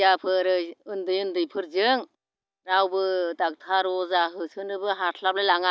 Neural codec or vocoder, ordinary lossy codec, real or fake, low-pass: none; none; real; none